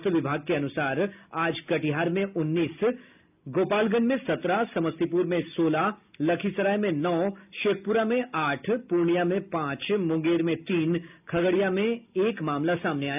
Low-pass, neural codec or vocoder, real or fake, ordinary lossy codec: 3.6 kHz; none; real; none